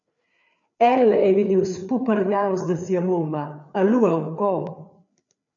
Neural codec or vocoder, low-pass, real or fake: codec, 16 kHz, 4 kbps, FreqCodec, larger model; 7.2 kHz; fake